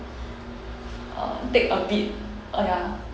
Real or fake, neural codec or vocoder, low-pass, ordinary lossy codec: real; none; none; none